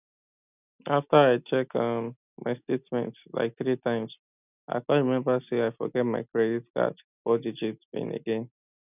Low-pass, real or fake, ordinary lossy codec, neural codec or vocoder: 3.6 kHz; real; none; none